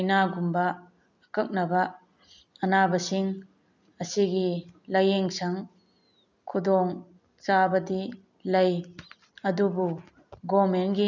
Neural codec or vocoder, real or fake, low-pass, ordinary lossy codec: none; real; 7.2 kHz; none